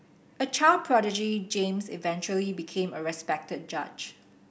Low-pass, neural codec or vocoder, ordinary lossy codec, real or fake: none; none; none; real